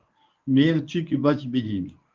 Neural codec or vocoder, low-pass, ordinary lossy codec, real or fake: codec, 24 kHz, 0.9 kbps, WavTokenizer, medium speech release version 1; 7.2 kHz; Opus, 24 kbps; fake